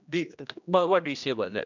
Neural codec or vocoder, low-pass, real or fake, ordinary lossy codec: codec, 16 kHz, 1 kbps, X-Codec, HuBERT features, trained on general audio; 7.2 kHz; fake; none